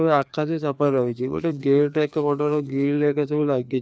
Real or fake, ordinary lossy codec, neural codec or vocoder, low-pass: fake; none; codec, 16 kHz, 2 kbps, FreqCodec, larger model; none